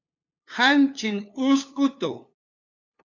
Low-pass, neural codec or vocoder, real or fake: 7.2 kHz; codec, 16 kHz, 2 kbps, FunCodec, trained on LibriTTS, 25 frames a second; fake